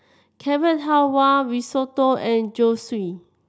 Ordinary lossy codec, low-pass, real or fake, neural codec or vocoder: none; none; real; none